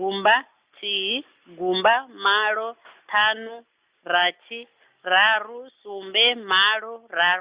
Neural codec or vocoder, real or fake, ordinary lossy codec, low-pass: none; real; Opus, 64 kbps; 3.6 kHz